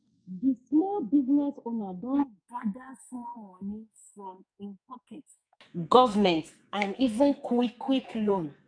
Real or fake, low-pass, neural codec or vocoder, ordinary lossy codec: fake; 9.9 kHz; codec, 44.1 kHz, 2.6 kbps, SNAC; none